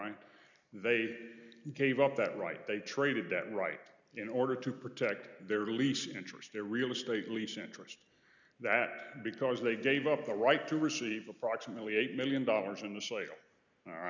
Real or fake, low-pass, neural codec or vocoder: real; 7.2 kHz; none